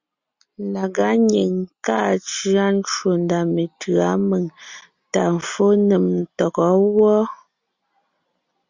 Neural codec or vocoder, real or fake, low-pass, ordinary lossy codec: none; real; 7.2 kHz; Opus, 64 kbps